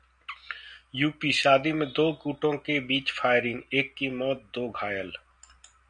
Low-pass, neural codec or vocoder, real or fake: 9.9 kHz; none; real